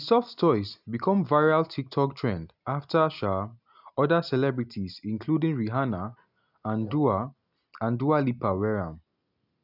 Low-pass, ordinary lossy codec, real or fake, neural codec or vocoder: 5.4 kHz; none; real; none